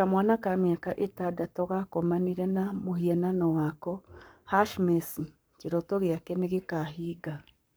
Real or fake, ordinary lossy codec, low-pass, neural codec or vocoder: fake; none; none; codec, 44.1 kHz, 7.8 kbps, Pupu-Codec